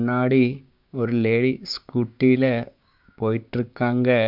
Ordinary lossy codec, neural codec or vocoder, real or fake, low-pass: MP3, 48 kbps; codec, 16 kHz, 6 kbps, DAC; fake; 5.4 kHz